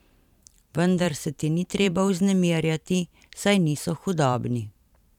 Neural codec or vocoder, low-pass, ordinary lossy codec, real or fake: vocoder, 44.1 kHz, 128 mel bands every 512 samples, BigVGAN v2; 19.8 kHz; none; fake